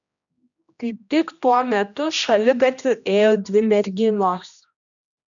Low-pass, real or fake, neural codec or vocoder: 7.2 kHz; fake; codec, 16 kHz, 1 kbps, X-Codec, HuBERT features, trained on general audio